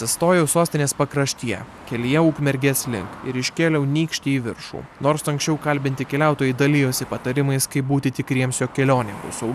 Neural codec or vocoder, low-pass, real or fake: none; 14.4 kHz; real